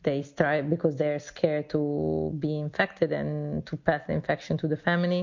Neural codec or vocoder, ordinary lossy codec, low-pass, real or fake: none; MP3, 48 kbps; 7.2 kHz; real